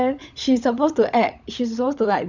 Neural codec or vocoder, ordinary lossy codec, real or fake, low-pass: codec, 16 kHz, 16 kbps, FunCodec, trained on LibriTTS, 50 frames a second; none; fake; 7.2 kHz